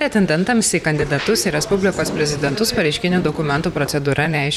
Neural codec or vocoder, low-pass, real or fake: vocoder, 44.1 kHz, 128 mel bands, Pupu-Vocoder; 19.8 kHz; fake